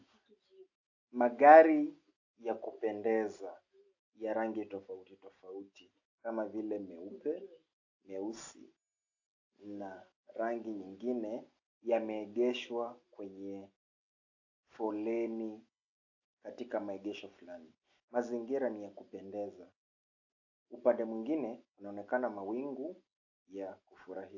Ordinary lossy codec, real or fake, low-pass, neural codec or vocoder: AAC, 48 kbps; real; 7.2 kHz; none